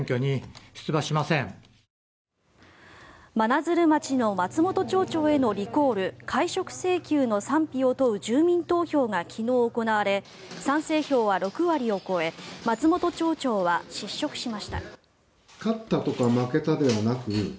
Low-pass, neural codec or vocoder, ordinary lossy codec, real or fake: none; none; none; real